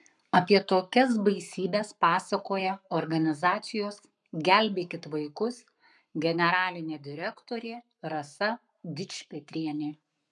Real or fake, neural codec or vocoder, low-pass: fake; codec, 44.1 kHz, 7.8 kbps, Pupu-Codec; 10.8 kHz